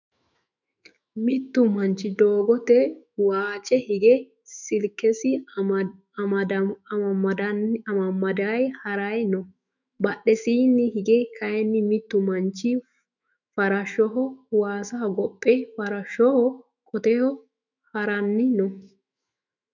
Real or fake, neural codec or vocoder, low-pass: fake; autoencoder, 48 kHz, 128 numbers a frame, DAC-VAE, trained on Japanese speech; 7.2 kHz